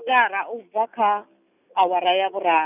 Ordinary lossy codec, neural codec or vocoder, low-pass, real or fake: none; none; 3.6 kHz; real